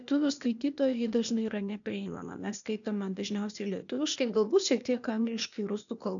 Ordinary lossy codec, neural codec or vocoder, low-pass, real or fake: MP3, 96 kbps; codec, 16 kHz, 0.8 kbps, ZipCodec; 7.2 kHz; fake